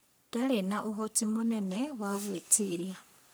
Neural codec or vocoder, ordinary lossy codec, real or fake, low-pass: codec, 44.1 kHz, 3.4 kbps, Pupu-Codec; none; fake; none